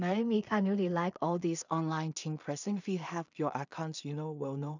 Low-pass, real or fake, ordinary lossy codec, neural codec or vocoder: 7.2 kHz; fake; none; codec, 16 kHz in and 24 kHz out, 0.4 kbps, LongCat-Audio-Codec, two codebook decoder